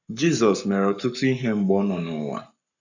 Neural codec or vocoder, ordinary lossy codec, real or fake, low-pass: codec, 44.1 kHz, 7.8 kbps, Pupu-Codec; none; fake; 7.2 kHz